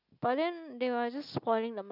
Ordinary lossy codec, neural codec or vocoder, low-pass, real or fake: none; none; 5.4 kHz; real